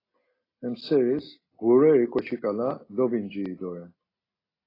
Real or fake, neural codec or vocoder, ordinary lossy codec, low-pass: real; none; AAC, 24 kbps; 5.4 kHz